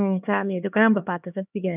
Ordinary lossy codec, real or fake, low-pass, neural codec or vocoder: none; fake; 3.6 kHz; codec, 16 kHz, 2 kbps, X-Codec, HuBERT features, trained on LibriSpeech